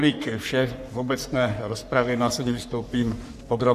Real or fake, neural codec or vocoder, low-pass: fake; codec, 44.1 kHz, 3.4 kbps, Pupu-Codec; 14.4 kHz